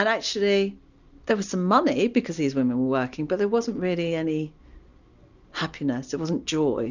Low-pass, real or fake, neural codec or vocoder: 7.2 kHz; real; none